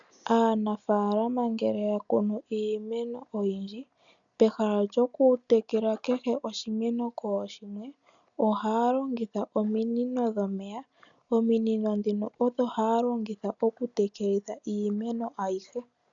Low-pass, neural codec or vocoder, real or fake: 7.2 kHz; none; real